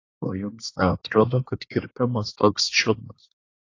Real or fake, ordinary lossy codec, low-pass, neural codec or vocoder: fake; AAC, 32 kbps; 7.2 kHz; codec, 24 kHz, 1 kbps, SNAC